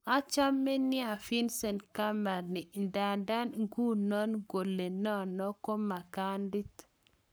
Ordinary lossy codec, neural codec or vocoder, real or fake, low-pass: none; codec, 44.1 kHz, 7.8 kbps, Pupu-Codec; fake; none